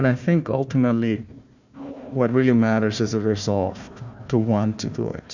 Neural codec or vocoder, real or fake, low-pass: codec, 16 kHz, 1 kbps, FunCodec, trained on Chinese and English, 50 frames a second; fake; 7.2 kHz